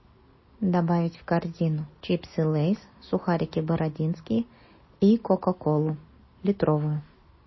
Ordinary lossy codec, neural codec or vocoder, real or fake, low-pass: MP3, 24 kbps; none; real; 7.2 kHz